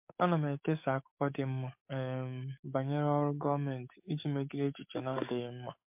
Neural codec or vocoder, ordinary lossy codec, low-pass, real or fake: codec, 44.1 kHz, 7.8 kbps, DAC; MP3, 32 kbps; 3.6 kHz; fake